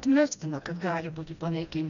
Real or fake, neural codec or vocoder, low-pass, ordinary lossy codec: fake; codec, 16 kHz, 1 kbps, FreqCodec, smaller model; 7.2 kHz; MP3, 96 kbps